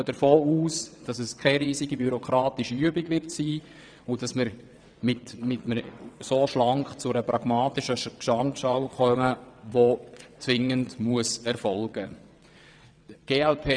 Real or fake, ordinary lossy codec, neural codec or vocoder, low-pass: fake; none; vocoder, 22.05 kHz, 80 mel bands, WaveNeXt; 9.9 kHz